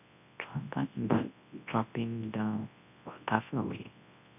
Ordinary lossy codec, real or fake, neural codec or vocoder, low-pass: none; fake; codec, 24 kHz, 0.9 kbps, WavTokenizer, large speech release; 3.6 kHz